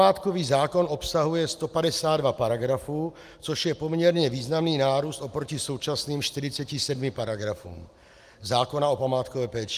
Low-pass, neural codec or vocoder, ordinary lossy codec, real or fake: 14.4 kHz; none; Opus, 32 kbps; real